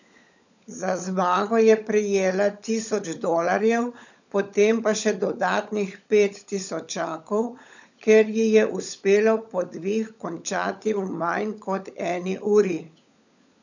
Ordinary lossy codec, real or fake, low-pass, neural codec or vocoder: none; fake; 7.2 kHz; codec, 16 kHz, 16 kbps, FunCodec, trained on LibriTTS, 50 frames a second